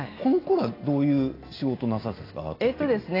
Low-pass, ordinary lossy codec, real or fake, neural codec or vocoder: 5.4 kHz; AAC, 24 kbps; real; none